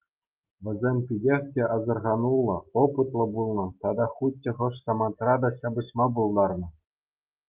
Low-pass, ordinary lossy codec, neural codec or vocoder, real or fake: 3.6 kHz; Opus, 32 kbps; none; real